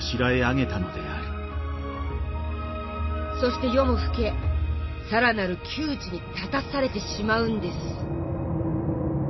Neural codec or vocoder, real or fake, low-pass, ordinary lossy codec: none; real; 7.2 kHz; MP3, 24 kbps